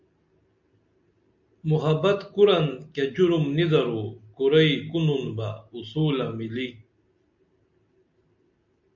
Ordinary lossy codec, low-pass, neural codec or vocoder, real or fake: MP3, 64 kbps; 7.2 kHz; none; real